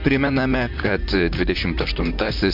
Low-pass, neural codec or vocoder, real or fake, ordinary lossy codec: 5.4 kHz; vocoder, 44.1 kHz, 128 mel bands, Pupu-Vocoder; fake; MP3, 48 kbps